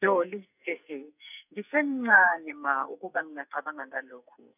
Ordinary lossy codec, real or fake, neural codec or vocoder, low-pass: none; fake; codec, 44.1 kHz, 2.6 kbps, SNAC; 3.6 kHz